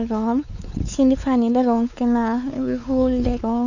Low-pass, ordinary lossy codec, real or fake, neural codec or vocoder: 7.2 kHz; none; fake; codec, 16 kHz, 4 kbps, X-Codec, WavLM features, trained on Multilingual LibriSpeech